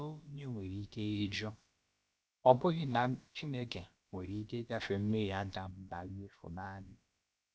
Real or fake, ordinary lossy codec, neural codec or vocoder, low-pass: fake; none; codec, 16 kHz, about 1 kbps, DyCAST, with the encoder's durations; none